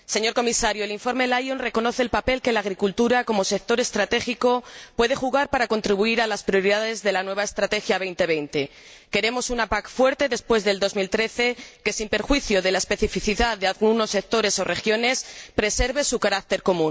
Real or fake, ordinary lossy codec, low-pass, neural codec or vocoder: real; none; none; none